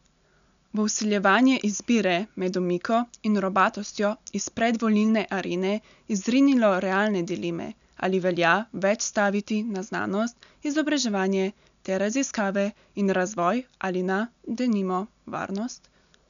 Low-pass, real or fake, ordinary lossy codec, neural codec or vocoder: 7.2 kHz; real; none; none